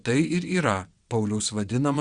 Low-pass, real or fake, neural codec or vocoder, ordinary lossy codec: 9.9 kHz; fake; vocoder, 22.05 kHz, 80 mel bands, WaveNeXt; Opus, 64 kbps